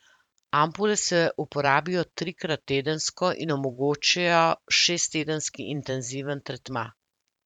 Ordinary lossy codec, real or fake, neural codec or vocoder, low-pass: none; fake; vocoder, 44.1 kHz, 128 mel bands every 512 samples, BigVGAN v2; 19.8 kHz